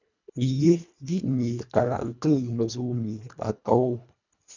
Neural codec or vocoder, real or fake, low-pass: codec, 24 kHz, 1.5 kbps, HILCodec; fake; 7.2 kHz